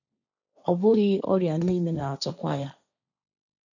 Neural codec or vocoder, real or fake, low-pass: codec, 16 kHz, 1.1 kbps, Voila-Tokenizer; fake; 7.2 kHz